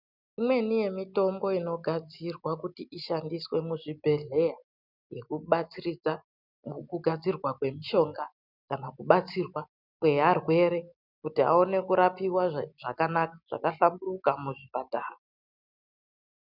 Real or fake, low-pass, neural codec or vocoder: real; 5.4 kHz; none